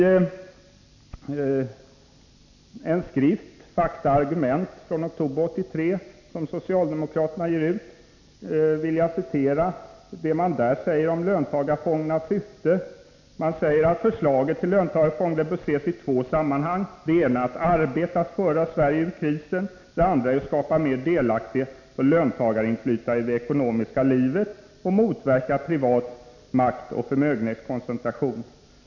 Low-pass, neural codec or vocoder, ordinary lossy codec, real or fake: 7.2 kHz; none; none; real